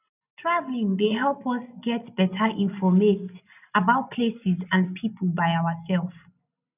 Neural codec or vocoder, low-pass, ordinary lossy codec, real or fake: none; 3.6 kHz; none; real